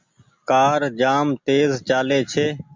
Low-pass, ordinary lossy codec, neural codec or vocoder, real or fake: 7.2 kHz; MP3, 64 kbps; none; real